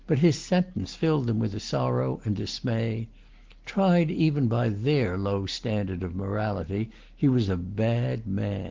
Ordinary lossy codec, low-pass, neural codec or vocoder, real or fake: Opus, 16 kbps; 7.2 kHz; none; real